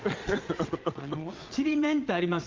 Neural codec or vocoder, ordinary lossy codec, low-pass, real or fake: vocoder, 22.05 kHz, 80 mel bands, Vocos; Opus, 32 kbps; 7.2 kHz; fake